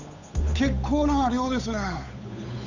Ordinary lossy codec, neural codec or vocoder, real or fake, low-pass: none; codec, 16 kHz, 8 kbps, FunCodec, trained on Chinese and English, 25 frames a second; fake; 7.2 kHz